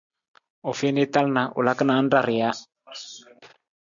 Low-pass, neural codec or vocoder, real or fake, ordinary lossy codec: 7.2 kHz; none; real; MP3, 96 kbps